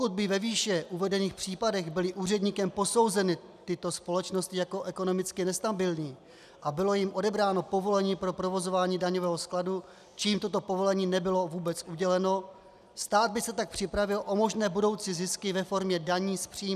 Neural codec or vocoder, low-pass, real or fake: none; 14.4 kHz; real